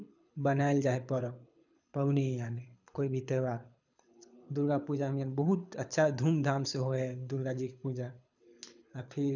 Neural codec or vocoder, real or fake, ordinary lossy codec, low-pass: codec, 24 kHz, 6 kbps, HILCodec; fake; none; 7.2 kHz